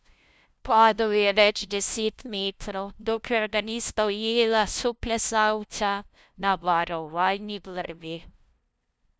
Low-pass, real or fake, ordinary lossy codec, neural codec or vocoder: none; fake; none; codec, 16 kHz, 0.5 kbps, FunCodec, trained on LibriTTS, 25 frames a second